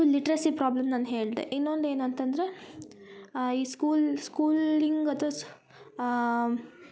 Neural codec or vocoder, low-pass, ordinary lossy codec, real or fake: none; none; none; real